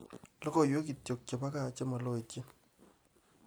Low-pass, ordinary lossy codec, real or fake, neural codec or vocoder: none; none; real; none